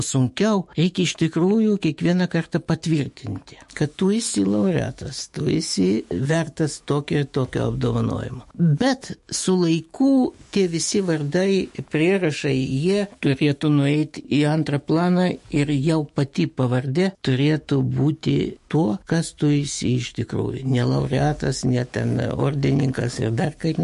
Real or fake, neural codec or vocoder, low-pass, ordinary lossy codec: fake; autoencoder, 48 kHz, 128 numbers a frame, DAC-VAE, trained on Japanese speech; 14.4 kHz; MP3, 48 kbps